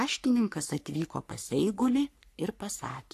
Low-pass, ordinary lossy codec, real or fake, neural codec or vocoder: 14.4 kHz; AAC, 64 kbps; fake; codec, 44.1 kHz, 3.4 kbps, Pupu-Codec